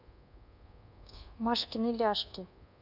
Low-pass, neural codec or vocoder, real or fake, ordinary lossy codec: 5.4 kHz; codec, 24 kHz, 1.2 kbps, DualCodec; fake; none